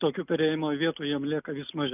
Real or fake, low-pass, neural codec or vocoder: real; 3.6 kHz; none